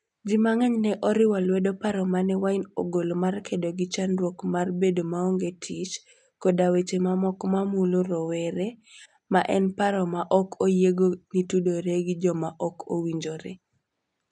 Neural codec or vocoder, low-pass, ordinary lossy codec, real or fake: none; 10.8 kHz; none; real